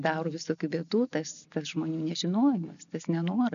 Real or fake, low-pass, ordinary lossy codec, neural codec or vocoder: real; 7.2 kHz; MP3, 96 kbps; none